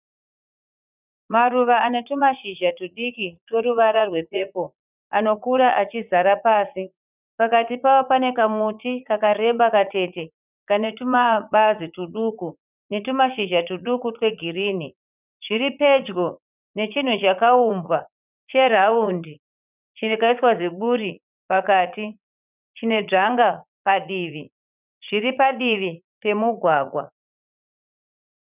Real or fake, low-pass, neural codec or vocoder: fake; 3.6 kHz; vocoder, 44.1 kHz, 80 mel bands, Vocos